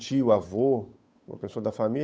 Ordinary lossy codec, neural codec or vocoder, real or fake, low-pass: none; codec, 16 kHz, 8 kbps, FunCodec, trained on Chinese and English, 25 frames a second; fake; none